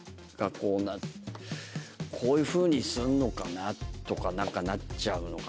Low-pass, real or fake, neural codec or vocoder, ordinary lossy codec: none; real; none; none